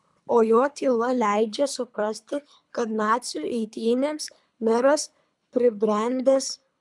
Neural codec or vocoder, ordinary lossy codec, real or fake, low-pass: codec, 24 kHz, 3 kbps, HILCodec; MP3, 96 kbps; fake; 10.8 kHz